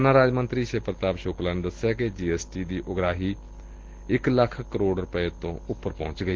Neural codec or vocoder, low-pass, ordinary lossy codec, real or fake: none; 7.2 kHz; Opus, 16 kbps; real